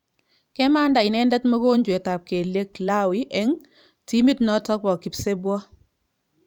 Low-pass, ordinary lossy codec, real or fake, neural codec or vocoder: 19.8 kHz; none; fake; vocoder, 44.1 kHz, 128 mel bands every 512 samples, BigVGAN v2